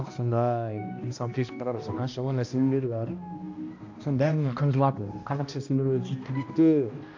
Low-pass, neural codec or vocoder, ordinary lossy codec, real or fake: 7.2 kHz; codec, 16 kHz, 1 kbps, X-Codec, HuBERT features, trained on balanced general audio; AAC, 48 kbps; fake